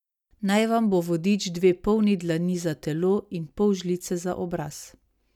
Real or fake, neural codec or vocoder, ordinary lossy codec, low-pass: real; none; none; 19.8 kHz